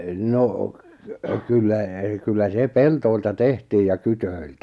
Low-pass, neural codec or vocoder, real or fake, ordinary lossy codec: none; none; real; none